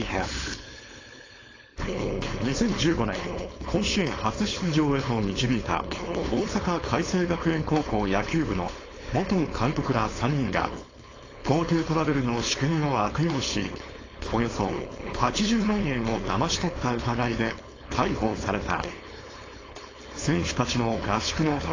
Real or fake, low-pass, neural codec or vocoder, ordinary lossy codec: fake; 7.2 kHz; codec, 16 kHz, 4.8 kbps, FACodec; AAC, 32 kbps